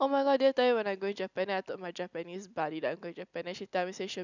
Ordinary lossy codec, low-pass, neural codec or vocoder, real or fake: none; 7.2 kHz; none; real